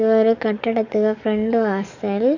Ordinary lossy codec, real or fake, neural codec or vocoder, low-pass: none; fake; codec, 44.1 kHz, 7.8 kbps, DAC; 7.2 kHz